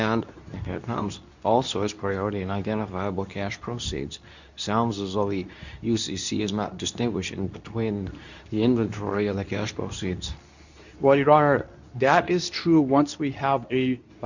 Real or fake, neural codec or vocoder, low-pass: fake; codec, 24 kHz, 0.9 kbps, WavTokenizer, medium speech release version 2; 7.2 kHz